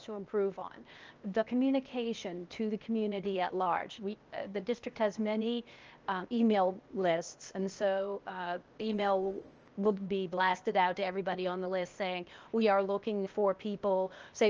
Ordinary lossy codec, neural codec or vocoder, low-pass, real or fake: Opus, 24 kbps; codec, 16 kHz, 0.8 kbps, ZipCodec; 7.2 kHz; fake